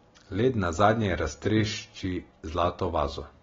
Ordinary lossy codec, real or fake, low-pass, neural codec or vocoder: AAC, 24 kbps; real; 7.2 kHz; none